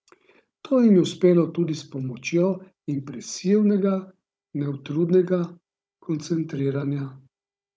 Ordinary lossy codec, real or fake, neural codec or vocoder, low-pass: none; fake; codec, 16 kHz, 16 kbps, FunCodec, trained on Chinese and English, 50 frames a second; none